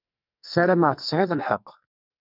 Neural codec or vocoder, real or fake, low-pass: codec, 44.1 kHz, 2.6 kbps, SNAC; fake; 5.4 kHz